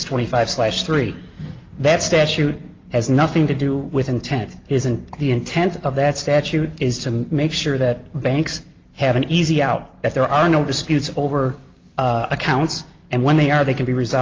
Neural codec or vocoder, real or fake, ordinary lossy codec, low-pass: none; real; Opus, 32 kbps; 7.2 kHz